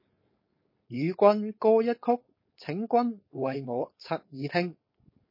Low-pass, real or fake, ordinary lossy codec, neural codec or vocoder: 5.4 kHz; fake; MP3, 24 kbps; codec, 16 kHz, 4.8 kbps, FACodec